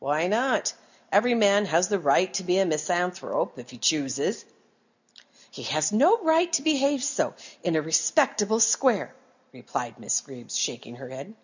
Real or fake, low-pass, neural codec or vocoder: real; 7.2 kHz; none